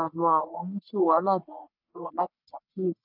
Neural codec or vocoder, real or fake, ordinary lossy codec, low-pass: codec, 44.1 kHz, 1.7 kbps, Pupu-Codec; fake; none; 5.4 kHz